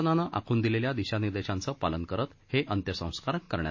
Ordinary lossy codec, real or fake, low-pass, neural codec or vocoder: MP3, 32 kbps; real; 7.2 kHz; none